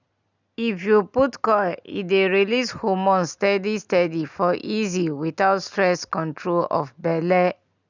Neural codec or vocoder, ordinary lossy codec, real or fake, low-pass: none; none; real; 7.2 kHz